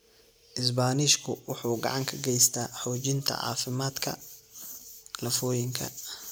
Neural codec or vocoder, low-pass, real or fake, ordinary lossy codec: none; none; real; none